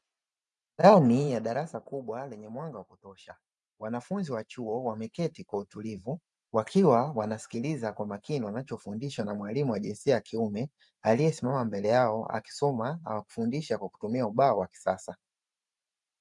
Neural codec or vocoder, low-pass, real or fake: vocoder, 44.1 kHz, 128 mel bands every 512 samples, BigVGAN v2; 10.8 kHz; fake